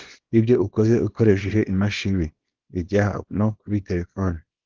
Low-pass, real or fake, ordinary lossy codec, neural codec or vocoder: 7.2 kHz; fake; Opus, 16 kbps; codec, 24 kHz, 0.9 kbps, WavTokenizer, small release